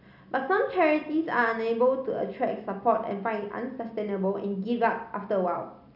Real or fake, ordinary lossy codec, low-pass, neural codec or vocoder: real; none; 5.4 kHz; none